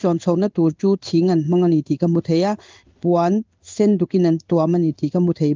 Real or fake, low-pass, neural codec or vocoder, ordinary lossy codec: fake; 7.2 kHz; codec, 16 kHz in and 24 kHz out, 1 kbps, XY-Tokenizer; Opus, 24 kbps